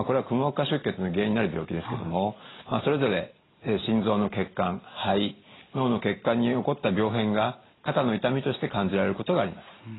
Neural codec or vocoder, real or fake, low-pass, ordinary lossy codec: none; real; 7.2 kHz; AAC, 16 kbps